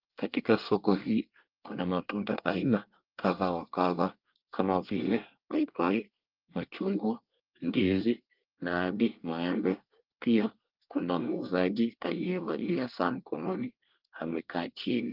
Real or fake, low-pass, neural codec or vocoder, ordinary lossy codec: fake; 5.4 kHz; codec, 24 kHz, 1 kbps, SNAC; Opus, 24 kbps